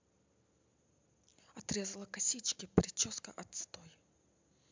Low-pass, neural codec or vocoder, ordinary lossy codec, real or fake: 7.2 kHz; none; MP3, 64 kbps; real